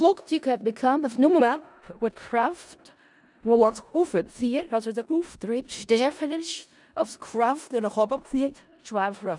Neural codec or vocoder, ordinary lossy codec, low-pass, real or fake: codec, 16 kHz in and 24 kHz out, 0.4 kbps, LongCat-Audio-Codec, four codebook decoder; none; 10.8 kHz; fake